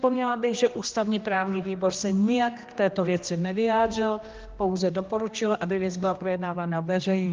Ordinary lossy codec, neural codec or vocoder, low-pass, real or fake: Opus, 24 kbps; codec, 16 kHz, 1 kbps, X-Codec, HuBERT features, trained on general audio; 7.2 kHz; fake